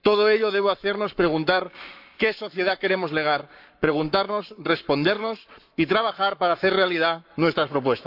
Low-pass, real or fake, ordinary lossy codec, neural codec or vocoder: 5.4 kHz; fake; AAC, 48 kbps; codec, 44.1 kHz, 7.8 kbps, Pupu-Codec